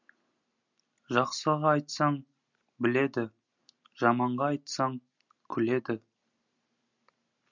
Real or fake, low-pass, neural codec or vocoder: real; 7.2 kHz; none